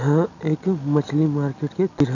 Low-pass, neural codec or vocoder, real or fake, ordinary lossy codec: 7.2 kHz; none; real; none